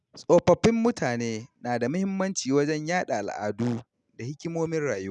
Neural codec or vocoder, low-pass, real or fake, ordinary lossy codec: none; 10.8 kHz; real; none